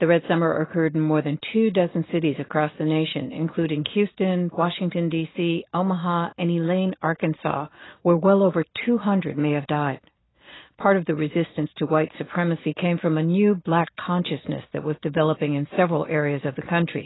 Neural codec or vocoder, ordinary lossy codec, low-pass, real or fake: none; AAC, 16 kbps; 7.2 kHz; real